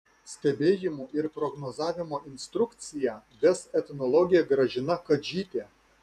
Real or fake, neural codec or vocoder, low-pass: fake; vocoder, 48 kHz, 128 mel bands, Vocos; 14.4 kHz